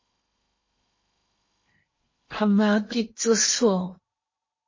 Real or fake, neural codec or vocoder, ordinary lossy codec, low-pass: fake; codec, 16 kHz in and 24 kHz out, 0.8 kbps, FocalCodec, streaming, 65536 codes; MP3, 32 kbps; 7.2 kHz